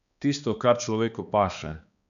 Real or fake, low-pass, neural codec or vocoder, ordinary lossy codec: fake; 7.2 kHz; codec, 16 kHz, 2 kbps, X-Codec, HuBERT features, trained on balanced general audio; none